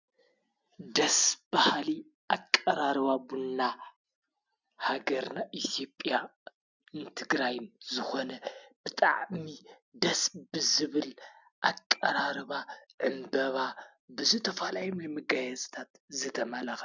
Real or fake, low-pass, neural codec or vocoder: real; 7.2 kHz; none